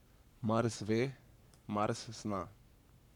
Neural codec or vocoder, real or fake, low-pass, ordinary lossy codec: codec, 44.1 kHz, 7.8 kbps, Pupu-Codec; fake; 19.8 kHz; none